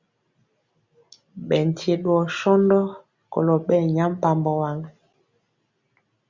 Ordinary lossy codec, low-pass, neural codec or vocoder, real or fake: Opus, 64 kbps; 7.2 kHz; none; real